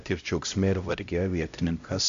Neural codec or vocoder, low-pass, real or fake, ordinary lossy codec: codec, 16 kHz, 0.5 kbps, X-Codec, HuBERT features, trained on LibriSpeech; 7.2 kHz; fake; MP3, 64 kbps